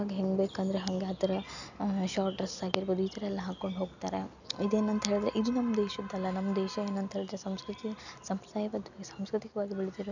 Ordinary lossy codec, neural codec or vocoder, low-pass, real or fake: none; none; 7.2 kHz; real